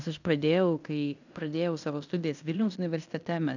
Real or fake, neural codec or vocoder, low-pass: fake; codec, 16 kHz in and 24 kHz out, 0.9 kbps, LongCat-Audio-Codec, fine tuned four codebook decoder; 7.2 kHz